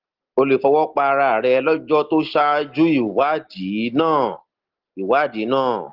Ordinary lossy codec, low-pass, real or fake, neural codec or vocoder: Opus, 16 kbps; 5.4 kHz; real; none